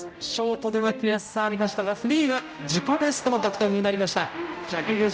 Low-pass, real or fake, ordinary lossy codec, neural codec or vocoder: none; fake; none; codec, 16 kHz, 0.5 kbps, X-Codec, HuBERT features, trained on general audio